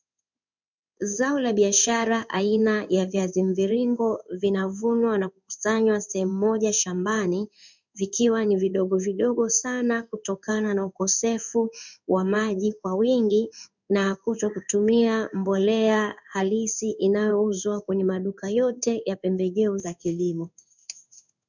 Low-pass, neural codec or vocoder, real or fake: 7.2 kHz; codec, 16 kHz in and 24 kHz out, 1 kbps, XY-Tokenizer; fake